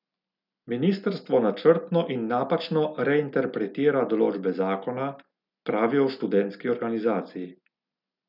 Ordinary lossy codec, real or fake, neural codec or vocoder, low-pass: none; real; none; 5.4 kHz